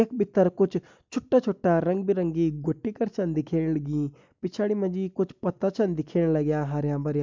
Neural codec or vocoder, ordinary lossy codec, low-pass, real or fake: none; MP3, 64 kbps; 7.2 kHz; real